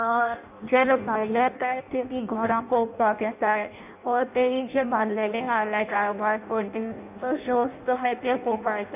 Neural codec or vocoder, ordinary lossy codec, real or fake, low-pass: codec, 16 kHz in and 24 kHz out, 0.6 kbps, FireRedTTS-2 codec; none; fake; 3.6 kHz